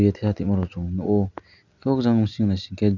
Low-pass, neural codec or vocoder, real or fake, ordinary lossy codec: 7.2 kHz; none; real; none